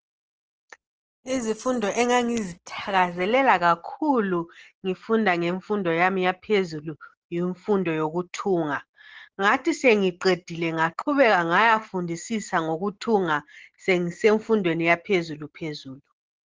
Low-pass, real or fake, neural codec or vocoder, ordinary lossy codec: 7.2 kHz; real; none; Opus, 16 kbps